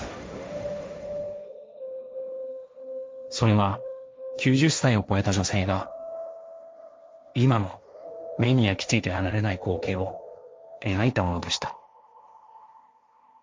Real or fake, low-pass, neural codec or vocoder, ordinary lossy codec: fake; none; codec, 16 kHz, 1.1 kbps, Voila-Tokenizer; none